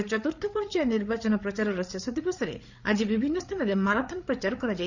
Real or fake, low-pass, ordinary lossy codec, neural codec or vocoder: fake; 7.2 kHz; none; codec, 16 kHz, 8 kbps, FreqCodec, larger model